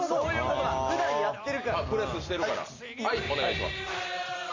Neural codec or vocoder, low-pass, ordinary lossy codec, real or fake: none; 7.2 kHz; MP3, 64 kbps; real